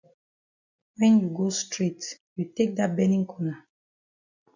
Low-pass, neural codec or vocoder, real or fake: 7.2 kHz; none; real